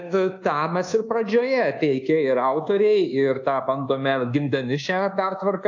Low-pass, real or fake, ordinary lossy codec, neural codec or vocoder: 7.2 kHz; fake; AAC, 48 kbps; codec, 24 kHz, 1.2 kbps, DualCodec